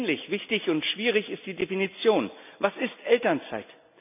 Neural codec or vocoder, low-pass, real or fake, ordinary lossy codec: none; 3.6 kHz; real; none